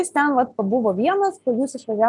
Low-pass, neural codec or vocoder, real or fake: 10.8 kHz; none; real